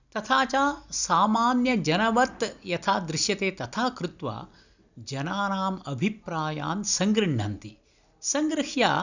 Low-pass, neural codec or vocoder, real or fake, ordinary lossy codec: 7.2 kHz; none; real; none